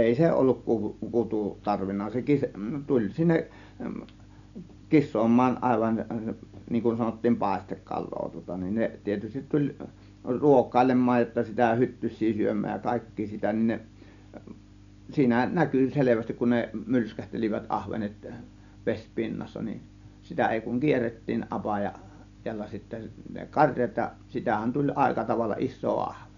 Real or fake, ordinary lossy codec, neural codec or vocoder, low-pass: real; none; none; 7.2 kHz